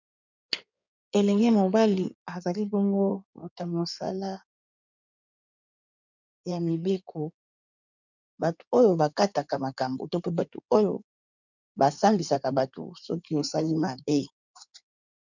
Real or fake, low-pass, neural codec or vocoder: fake; 7.2 kHz; codec, 16 kHz in and 24 kHz out, 2.2 kbps, FireRedTTS-2 codec